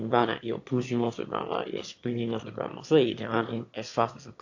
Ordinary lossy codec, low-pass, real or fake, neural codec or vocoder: AAC, 48 kbps; 7.2 kHz; fake; autoencoder, 22.05 kHz, a latent of 192 numbers a frame, VITS, trained on one speaker